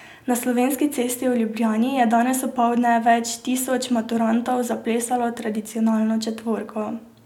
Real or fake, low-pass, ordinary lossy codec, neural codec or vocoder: real; 19.8 kHz; none; none